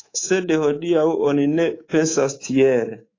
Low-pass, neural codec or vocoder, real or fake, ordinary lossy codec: 7.2 kHz; codec, 24 kHz, 3.1 kbps, DualCodec; fake; AAC, 32 kbps